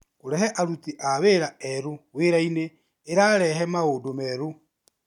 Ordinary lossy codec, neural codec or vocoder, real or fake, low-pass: MP3, 96 kbps; none; real; 19.8 kHz